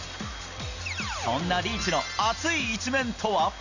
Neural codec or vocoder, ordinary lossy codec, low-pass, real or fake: none; none; 7.2 kHz; real